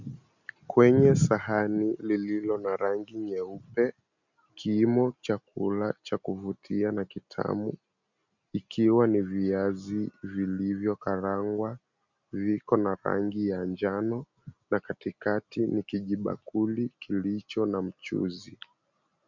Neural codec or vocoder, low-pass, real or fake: none; 7.2 kHz; real